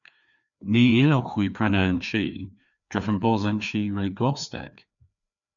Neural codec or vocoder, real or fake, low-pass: codec, 16 kHz, 2 kbps, FreqCodec, larger model; fake; 7.2 kHz